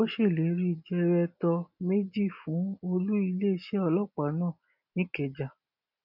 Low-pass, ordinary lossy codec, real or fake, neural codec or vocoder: 5.4 kHz; none; real; none